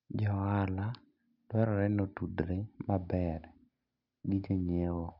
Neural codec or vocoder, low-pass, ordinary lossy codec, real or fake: none; 5.4 kHz; none; real